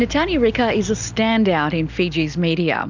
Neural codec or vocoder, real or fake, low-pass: none; real; 7.2 kHz